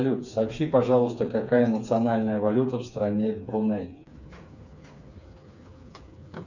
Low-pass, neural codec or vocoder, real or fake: 7.2 kHz; codec, 16 kHz, 4 kbps, FreqCodec, smaller model; fake